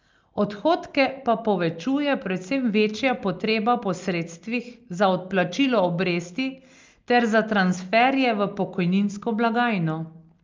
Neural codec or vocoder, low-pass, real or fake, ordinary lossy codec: none; 7.2 kHz; real; Opus, 24 kbps